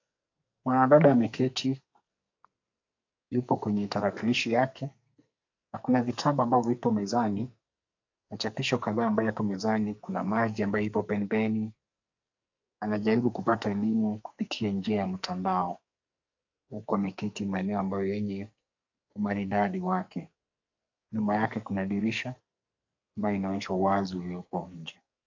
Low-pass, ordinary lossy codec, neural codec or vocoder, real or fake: 7.2 kHz; AAC, 48 kbps; codec, 44.1 kHz, 2.6 kbps, SNAC; fake